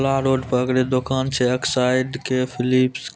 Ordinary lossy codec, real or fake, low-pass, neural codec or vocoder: none; real; none; none